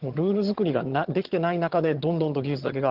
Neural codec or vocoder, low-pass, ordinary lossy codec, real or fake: vocoder, 22.05 kHz, 80 mel bands, HiFi-GAN; 5.4 kHz; Opus, 16 kbps; fake